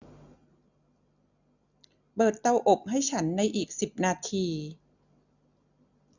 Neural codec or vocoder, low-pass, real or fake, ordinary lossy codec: none; 7.2 kHz; real; none